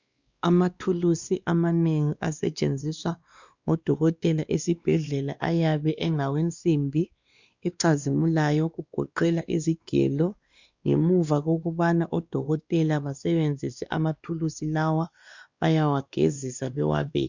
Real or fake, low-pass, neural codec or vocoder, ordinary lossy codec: fake; 7.2 kHz; codec, 16 kHz, 2 kbps, X-Codec, WavLM features, trained on Multilingual LibriSpeech; Opus, 64 kbps